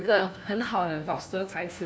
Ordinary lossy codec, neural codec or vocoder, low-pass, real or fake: none; codec, 16 kHz, 1 kbps, FunCodec, trained on LibriTTS, 50 frames a second; none; fake